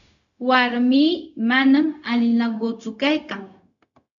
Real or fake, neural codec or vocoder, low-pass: fake; codec, 16 kHz, 0.4 kbps, LongCat-Audio-Codec; 7.2 kHz